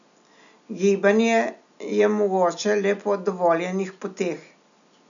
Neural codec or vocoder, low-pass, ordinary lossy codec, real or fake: none; 7.2 kHz; none; real